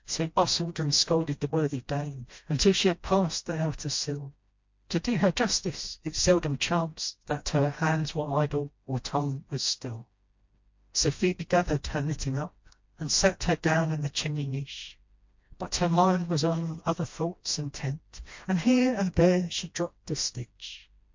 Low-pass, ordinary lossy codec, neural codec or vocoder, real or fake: 7.2 kHz; MP3, 48 kbps; codec, 16 kHz, 1 kbps, FreqCodec, smaller model; fake